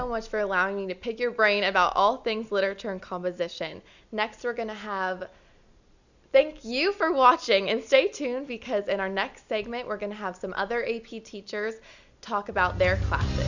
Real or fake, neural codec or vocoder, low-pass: real; none; 7.2 kHz